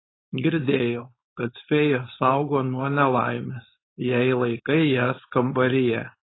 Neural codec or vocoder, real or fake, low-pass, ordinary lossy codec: codec, 16 kHz, 4.8 kbps, FACodec; fake; 7.2 kHz; AAC, 16 kbps